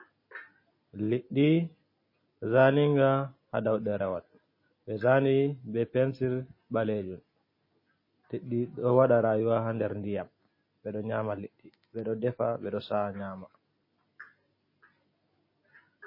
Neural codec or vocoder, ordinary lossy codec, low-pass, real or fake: none; MP3, 24 kbps; 5.4 kHz; real